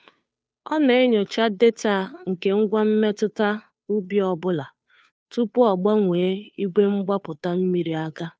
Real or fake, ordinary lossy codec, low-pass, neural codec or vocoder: fake; none; none; codec, 16 kHz, 2 kbps, FunCodec, trained on Chinese and English, 25 frames a second